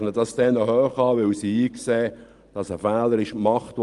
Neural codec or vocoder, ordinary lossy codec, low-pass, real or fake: none; Opus, 32 kbps; 10.8 kHz; real